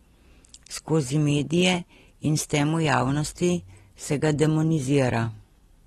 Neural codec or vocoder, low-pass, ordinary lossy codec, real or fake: none; 19.8 kHz; AAC, 32 kbps; real